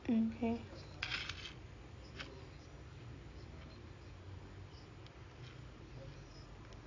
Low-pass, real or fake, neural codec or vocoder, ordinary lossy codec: 7.2 kHz; real; none; AAC, 32 kbps